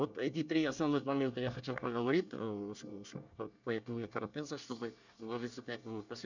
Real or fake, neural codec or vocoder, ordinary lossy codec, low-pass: fake; codec, 24 kHz, 1 kbps, SNAC; none; 7.2 kHz